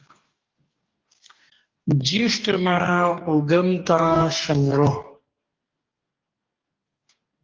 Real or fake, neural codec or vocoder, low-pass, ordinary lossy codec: fake; codec, 44.1 kHz, 2.6 kbps, DAC; 7.2 kHz; Opus, 32 kbps